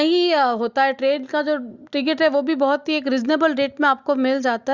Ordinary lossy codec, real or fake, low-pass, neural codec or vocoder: Opus, 64 kbps; real; 7.2 kHz; none